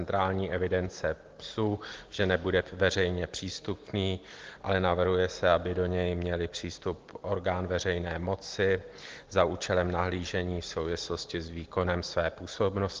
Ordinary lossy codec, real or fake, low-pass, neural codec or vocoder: Opus, 16 kbps; real; 7.2 kHz; none